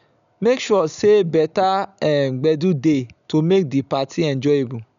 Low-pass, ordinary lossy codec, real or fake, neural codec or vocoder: 7.2 kHz; none; real; none